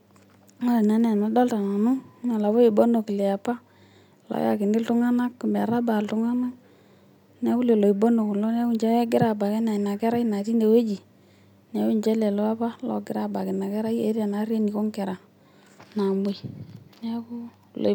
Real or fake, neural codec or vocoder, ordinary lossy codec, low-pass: real; none; none; 19.8 kHz